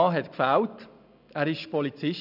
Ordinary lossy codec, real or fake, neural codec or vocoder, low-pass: none; real; none; 5.4 kHz